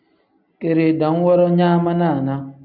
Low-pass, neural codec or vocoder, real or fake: 5.4 kHz; none; real